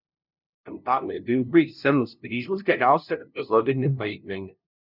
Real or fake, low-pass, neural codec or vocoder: fake; 5.4 kHz; codec, 16 kHz, 0.5 kbps, FunCodec, trained on LibriTTS, 25 frames a second